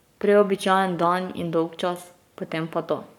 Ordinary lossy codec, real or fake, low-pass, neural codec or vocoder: none; fake; 19.8 kHz; codec, 44.1 kHz, 7.8 kbps, Pupu-Codec